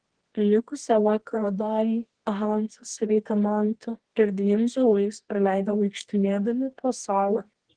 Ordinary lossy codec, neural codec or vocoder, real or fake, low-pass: Opus, 16 kbps; codec, 24 kHz, 0.9 kbps, WavTokenizer, medium music audio release; fake; 9.9 kHz